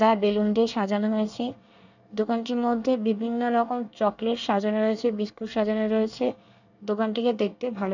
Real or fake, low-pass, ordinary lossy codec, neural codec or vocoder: fake; 7.2 kHz; none; codec, 24 kHz, 1 kbps, SNAC